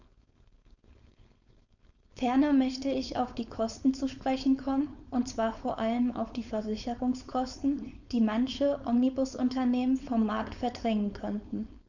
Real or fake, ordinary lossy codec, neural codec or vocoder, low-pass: fake; none; codec, 16 kHz, 4.8 kbps, FACodec; 7.2 kHz